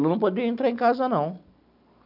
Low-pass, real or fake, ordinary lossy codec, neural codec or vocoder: 5.4 kHz; real; none; none